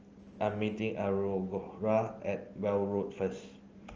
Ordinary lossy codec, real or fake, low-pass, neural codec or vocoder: Opus, 24 kbps; real; 7.2 kHz; none